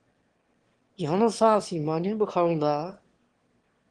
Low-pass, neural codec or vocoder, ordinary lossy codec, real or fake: 9.9 kHz; autoencoder, 22.05 kHz, a latent of 192 numbers a frame, VITS, trained on one speaker; Opus, 16 kbps; fake